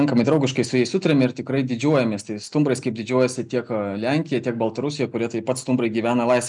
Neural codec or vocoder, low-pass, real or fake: none; 10.8 kHz; real